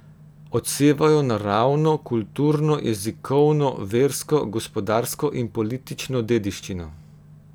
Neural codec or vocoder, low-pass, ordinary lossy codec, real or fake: none; none; none; real